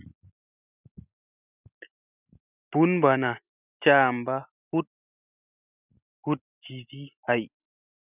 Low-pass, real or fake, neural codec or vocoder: 3.6 kHz; real; none